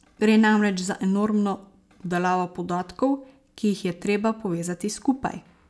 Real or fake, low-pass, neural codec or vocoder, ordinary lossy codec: real; none; none; none